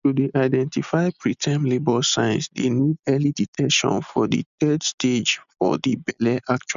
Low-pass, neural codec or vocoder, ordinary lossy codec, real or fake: 7.2 kHz; none; none; real